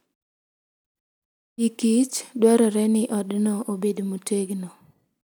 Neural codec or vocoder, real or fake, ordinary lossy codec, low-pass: none; real; none; none